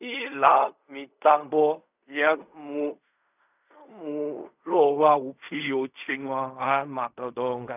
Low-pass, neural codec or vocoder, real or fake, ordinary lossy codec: 3.6 kHz; codec, 16 kHz in and 24 kHz out, 0.4 kbps, LongCat-Audio-Codec, fine tuned four codebook decoder; fake; none